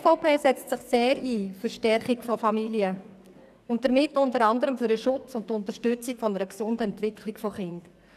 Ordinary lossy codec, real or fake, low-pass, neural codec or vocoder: none; fake; 14.4 kHz; codec, 44.1 kHz, 2.6 kbps, SNAC